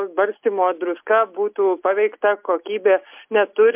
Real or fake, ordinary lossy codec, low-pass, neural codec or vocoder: real; MP3, 32 kbps; 3.6 kHz; none